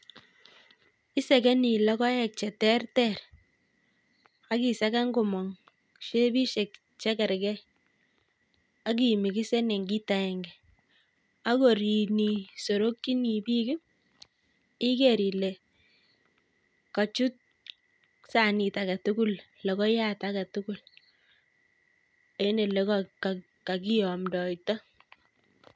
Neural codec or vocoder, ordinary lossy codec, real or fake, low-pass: none; none; real; none